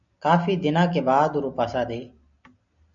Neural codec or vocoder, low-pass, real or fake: none; 7.2 kHz; real